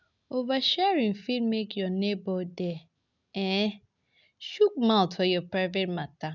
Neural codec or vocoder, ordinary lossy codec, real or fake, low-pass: none; none; real; 7.2 kHz